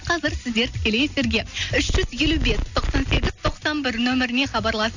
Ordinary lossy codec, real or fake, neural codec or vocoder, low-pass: none; real; none; 7.2 kHz